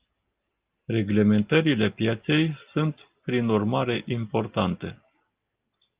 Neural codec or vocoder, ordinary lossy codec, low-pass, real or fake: none; Opus, 32 kbps; 3.6 kHz; real